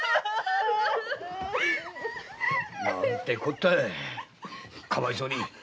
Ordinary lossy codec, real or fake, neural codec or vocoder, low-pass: none; real; none; none